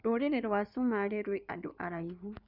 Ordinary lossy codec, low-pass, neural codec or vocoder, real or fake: none; 5.4 kHz; codec, 16 kHz in and 24 kHz out, 2.2 kbps, FireRedTTS-2 codec; fake